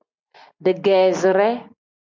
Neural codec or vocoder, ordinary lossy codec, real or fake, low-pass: none; MP3, 64 kbps; real; 7.2 kHz